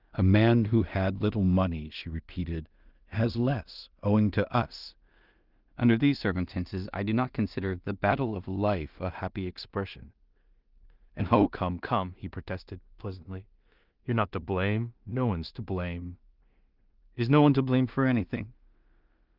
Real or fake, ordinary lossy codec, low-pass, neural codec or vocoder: fake; Opus, 32 kbps; 5.4 kHz; codec, 16 kHz in and 24 kHz out, 0.4 kbps, LongCat-Audio-Codec, two codebook decoder